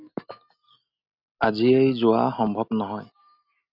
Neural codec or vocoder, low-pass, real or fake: none; 5.4 kHz; real